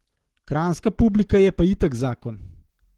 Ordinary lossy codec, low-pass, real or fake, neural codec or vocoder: Opus, 16 kbps; 19.8 kHz; fake; codec, 44.1 kHz, 7.8 kbps, Pupu-Codec